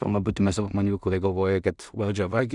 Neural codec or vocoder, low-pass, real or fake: codec, 16 kHz in and 24 kHz out, 0.4 kbps, LongCat-Audio-Codec, two codebook decoder; 10.8 kHz; fake